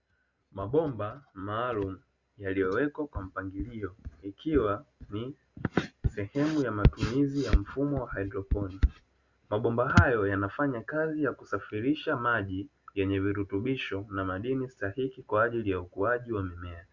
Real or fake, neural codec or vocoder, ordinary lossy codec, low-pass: real; none; Opus, 64 kbps; 7.2 kHz